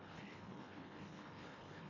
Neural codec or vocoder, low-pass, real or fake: codec, 24 kHz, 1.5 kbps, HILCodec; 7.2 kHz; fake